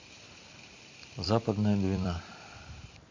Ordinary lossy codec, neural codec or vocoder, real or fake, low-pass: MP3, 48 kbps; vocoder, 44.1 kHz, 128 mel bands every 256 samples, BigVGAN v2; fake; 7.2 kHz